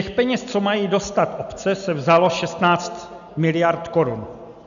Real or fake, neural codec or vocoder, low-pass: real; none; 7.2 kHz